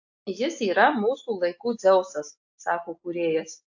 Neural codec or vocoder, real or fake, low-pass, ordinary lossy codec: none; real; 7.2 kHz; AAC, 48 kbps